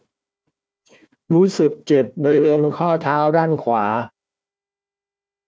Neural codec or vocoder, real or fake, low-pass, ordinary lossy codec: codec, 16 kHz, 1 kbps, FunCodec, trained on Chinese and English, 50 frames a second; fake; none; none